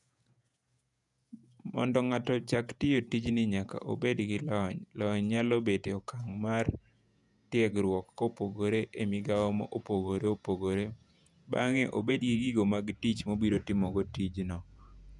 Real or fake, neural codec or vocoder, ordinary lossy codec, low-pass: fake; vocoder, 48 kHz, 128 mel bands, Vocos; none; 10.8 kHz